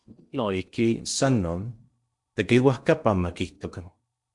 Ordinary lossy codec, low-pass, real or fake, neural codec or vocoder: MP3, 64 kbps; 10.8 kHz; fake; codec, 16 kHz in and 24 kHz out, 0.8 kbps, FocalCodec, streaming, 65536 codes